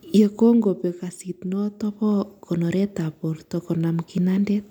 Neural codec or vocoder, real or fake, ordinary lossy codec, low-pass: none; real; none; 19.8 kHz